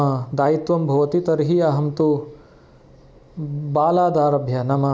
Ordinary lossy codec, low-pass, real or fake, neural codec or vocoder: none; none; real; none